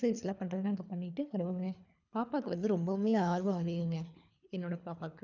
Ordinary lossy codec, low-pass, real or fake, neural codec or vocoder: none; 7.2 kHz; fake; codec, 24 kHz, 3 kbps, HILCodec